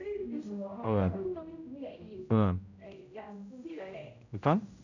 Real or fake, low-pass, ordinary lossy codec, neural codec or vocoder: fake; 7.2 kHz; none; codec, 16 kHz, 0.5 kbps, X-Codec, HuBERT features, trained on general audio